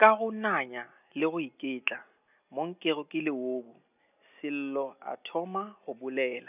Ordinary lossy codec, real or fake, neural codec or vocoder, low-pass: none; real; none; 3.6 kHz